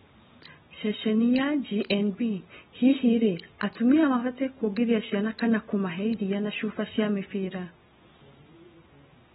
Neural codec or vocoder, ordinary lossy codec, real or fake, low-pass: none; AAC, 16 kbps; real; 19.8 kHz